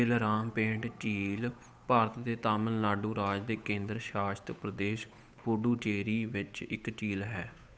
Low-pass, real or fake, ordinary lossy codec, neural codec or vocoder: none; fake; none; codec, 16 kHz, 8 kbps, FunCodec, trained on Chinese and English, 25 frames a second